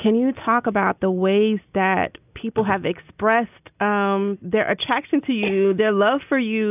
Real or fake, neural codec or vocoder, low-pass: real; none; 3.6 kHz